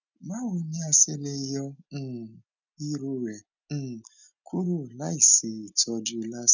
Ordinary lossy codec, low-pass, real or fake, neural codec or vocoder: none; 7.2 kHz; real; none